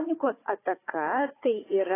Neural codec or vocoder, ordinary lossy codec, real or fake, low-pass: codec, 16 kHz, 4 kbps, FunCodec, trained on Chinese and English, 50 frames a second; AAC, 16 kbps; fake; 3.6 kHz